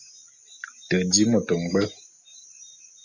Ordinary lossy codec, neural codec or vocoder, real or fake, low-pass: Opus, 64 kbps; none; real; 7.2 kHz